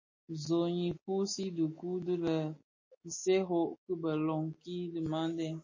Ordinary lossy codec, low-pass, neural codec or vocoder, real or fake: MP3, 32 kbps; 7.2 kHz; none; real